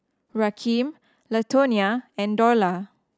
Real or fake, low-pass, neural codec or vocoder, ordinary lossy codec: real; none; none; none